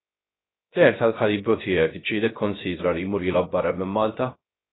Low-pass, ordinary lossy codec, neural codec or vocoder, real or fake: 7.2 kHz; AAC, 16 kbps; codec, 16 kHz, 0.3 kbps, FocalCodec; fake